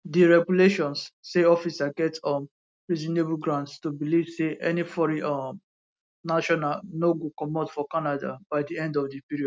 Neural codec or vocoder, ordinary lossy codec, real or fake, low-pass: none; none; real; none